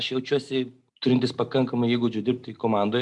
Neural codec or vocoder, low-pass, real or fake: none; 10.8 kHz; real